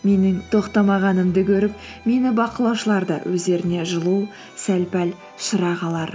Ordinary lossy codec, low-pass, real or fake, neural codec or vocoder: none; none; real; none